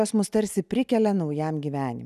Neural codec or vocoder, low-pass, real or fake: none; 14.4 kHz; real